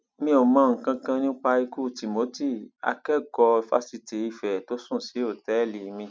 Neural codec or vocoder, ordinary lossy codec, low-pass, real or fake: none; none; none; real